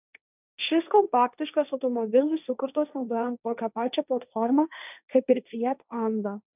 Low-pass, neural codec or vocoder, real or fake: 3.6 kHz; codec, 16 kHz, 1.1 kbps, Voila-Tokenizer; fake